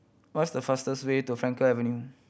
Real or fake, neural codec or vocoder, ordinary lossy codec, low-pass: real; none; none; none